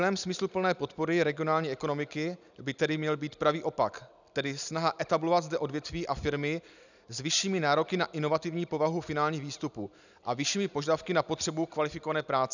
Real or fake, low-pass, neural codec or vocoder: real; 7.2 kHz; none